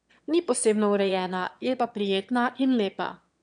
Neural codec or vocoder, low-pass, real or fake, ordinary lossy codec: autoencoder, 22.05 kHz, a latent of 192 numbers a frame, VITS, trained on one speaker; 9.9 kHz; fake; none